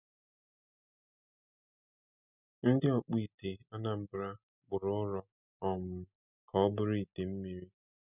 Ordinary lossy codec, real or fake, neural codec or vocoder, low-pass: none; real; none; 3.6 kHz